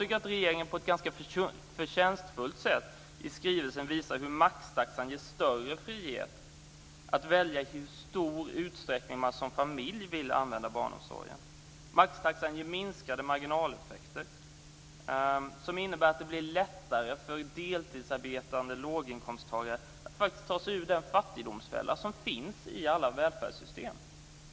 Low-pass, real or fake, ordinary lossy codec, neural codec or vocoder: none; real; none; none